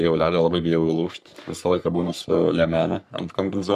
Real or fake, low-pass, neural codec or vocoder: fake; 14.4 kHz; codec, 44.1 kHz, 3.4 kbps, Pupu-Codec